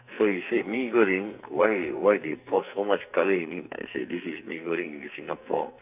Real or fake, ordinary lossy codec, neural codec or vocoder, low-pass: fake; none; codec, 44.1 kHz, 2.6 kbps, SNAC; 3.6 kHz